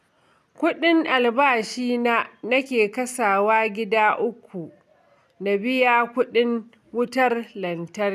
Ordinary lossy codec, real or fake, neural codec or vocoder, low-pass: none; real; none; 14.4 kHz